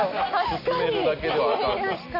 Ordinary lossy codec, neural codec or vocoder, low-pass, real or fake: none; none; 5.4 kHz; real